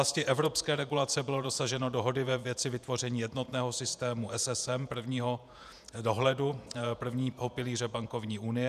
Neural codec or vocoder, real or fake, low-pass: vocoder, 48 kHz, 128 mel bands, Vocos; fake; 14.4 kHz